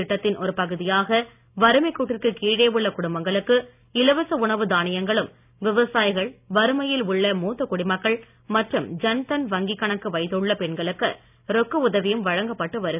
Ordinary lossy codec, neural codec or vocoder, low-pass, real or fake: none; none; 3.6 kHz; real